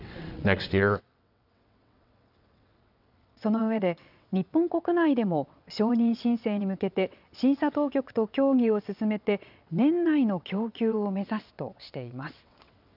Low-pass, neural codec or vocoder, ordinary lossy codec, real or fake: 5.4 kHz; vocoder, 22.05 kHz, 80 mel bands, WaveNeXt; none; fake